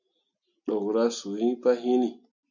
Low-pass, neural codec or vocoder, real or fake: 7.2 kHz; none; real